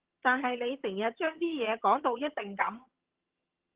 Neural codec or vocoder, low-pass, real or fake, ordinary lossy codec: vocoder, 22.05 kHz, 80 mel bands, HiFi-GAN; 3.6 kHz; fake; Opus, 16 kbps